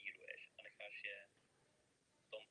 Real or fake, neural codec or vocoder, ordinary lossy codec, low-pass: real; none; Opus, 32 kbps; 9.9 kHz